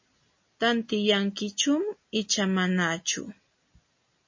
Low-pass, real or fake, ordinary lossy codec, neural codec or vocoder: 7.2 kHz; real; MP3, 32 kbps; none